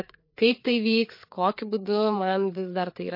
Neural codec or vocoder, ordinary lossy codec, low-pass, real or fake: codec, 16 kHz, 4 kbps, FreqCodec, larger model; MP3, 32 kbps; 5.4 kHz; fake